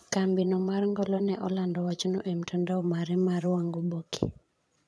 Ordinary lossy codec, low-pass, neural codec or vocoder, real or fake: none; none; vocoder, 22.05 kHz, 80 mel bands, Vocos; fake